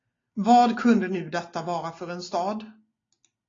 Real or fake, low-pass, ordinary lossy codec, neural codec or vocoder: real; 7.2 kHz; AAC, 32 kbps; none